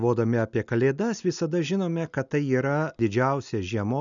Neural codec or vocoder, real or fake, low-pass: none; real; 7.2 kHz